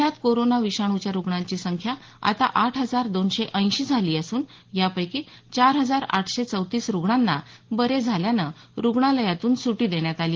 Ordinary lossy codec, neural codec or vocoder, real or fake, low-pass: Opus, 16 kbps; none; real; 7.2 kHz